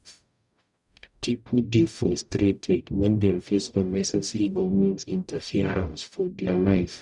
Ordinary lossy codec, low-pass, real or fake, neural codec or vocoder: none; 10.8 kHz; fake; codec, 44.1 kHz, 0.9 kbps, DAC